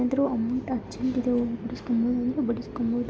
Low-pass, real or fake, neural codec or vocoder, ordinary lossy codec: none; real; none; none